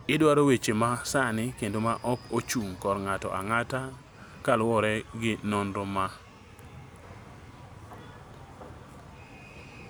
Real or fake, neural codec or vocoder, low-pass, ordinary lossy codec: real; none; none; none